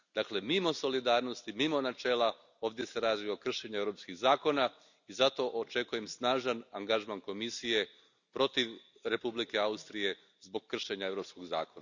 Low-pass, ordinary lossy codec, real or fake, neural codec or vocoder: 7.2 kHz; none; real; none